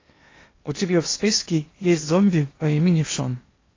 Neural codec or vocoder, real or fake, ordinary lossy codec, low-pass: codec, 16 kHz in and 24 kHz out, 0.8 kbps, FocalCodec, streaming, 65536 codes; fake; AAC, 32 kbps; 7.2 kHz